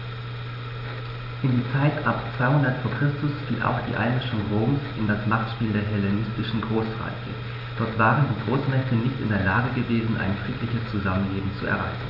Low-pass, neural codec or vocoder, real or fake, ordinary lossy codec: 5.4 kHz; none; real; none